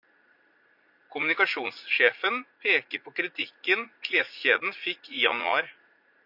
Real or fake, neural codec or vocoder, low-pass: fake; vocoder, 44.1 kHz, 80 mel bands, Vocos; 5.4 kHz